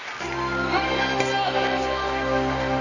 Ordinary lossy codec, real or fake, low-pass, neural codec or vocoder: none; fake; 7.2 kHz; codec, 24 kHz, 0.9 kbps, WavTokenizer, medium music audio release